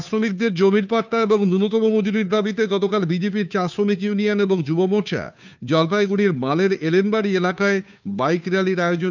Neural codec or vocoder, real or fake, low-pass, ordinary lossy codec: codec, 16 kHz, 2 kbps, FunCodec, trained on Chinese and English, 25 frames a second; fake; 7.2 kHz; none